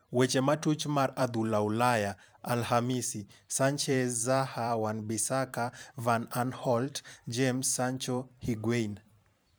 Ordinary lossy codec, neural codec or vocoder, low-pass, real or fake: none; vocoder, 44.1 kHz, 128 mel bands every 512 samples, BigVGAN v2; none; fake